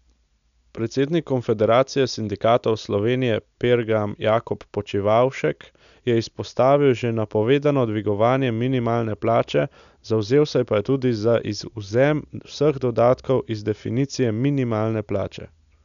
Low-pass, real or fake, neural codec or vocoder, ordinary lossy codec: 7.2 kHz; real; none; none